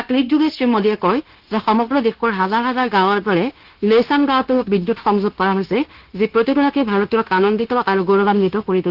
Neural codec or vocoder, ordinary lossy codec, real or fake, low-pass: codec, 16 kHz, 0.9 kbps, LongCat-Audio-Codec; Opus, 16 kbps; fake; 5.4 kHz